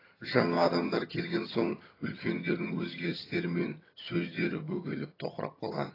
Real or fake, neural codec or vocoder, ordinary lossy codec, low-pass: fake; vocoder, 22.05 kHz, 80 mel bands, HiFi-GAN; AAC, 24 kbps; 5.4 kHz